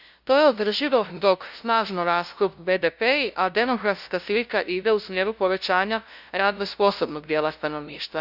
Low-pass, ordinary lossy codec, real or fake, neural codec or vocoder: 5.4 kHz; none; fake; codec, 16 kHz, 0.5 kbps, FunCodec, trained on LibriTTS, 25 frames a second